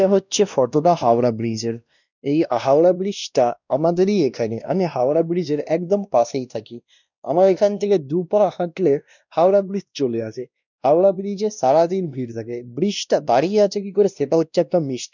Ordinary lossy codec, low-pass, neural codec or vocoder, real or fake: none; 7.2 kHz; codec, 16 kHz, 1 kbps, X-Codec, WavLM features, trained on Multilingual LibriSpeech; fake